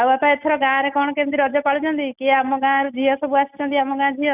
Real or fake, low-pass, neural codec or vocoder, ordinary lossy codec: real; 3.6 kHz; none; none